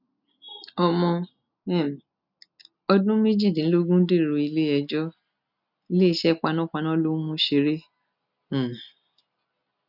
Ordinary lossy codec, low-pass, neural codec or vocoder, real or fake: none; 5.4 kHz; none; real